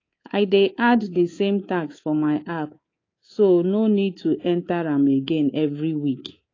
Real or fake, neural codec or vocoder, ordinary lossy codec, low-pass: fake; codec, 24 kHz, 3.1 kbps, DualCodec; AAC, 32 kbps; 7.2 kHz